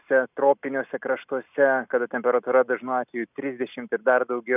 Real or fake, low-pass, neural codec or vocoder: real; 3.6 kHz; none